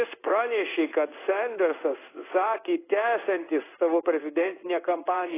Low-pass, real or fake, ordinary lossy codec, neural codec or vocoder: 3.6 kHz; fake; AAC, 16 kbps; codec, 16 kHz in and 24 kHz out, 1 kbps, XY-Tokenizer